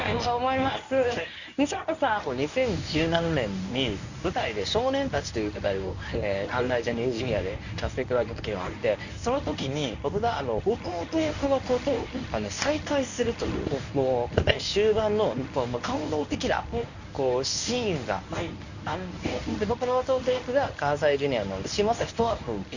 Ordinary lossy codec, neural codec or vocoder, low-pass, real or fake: none; codec, 24 kHz, 0.9 kbps, WavTokenizer, medium speech release version 1; 7.2 kHz; fake